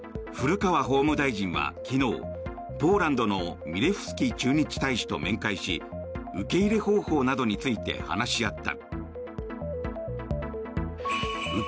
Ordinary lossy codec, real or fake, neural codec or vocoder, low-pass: none; real; none; none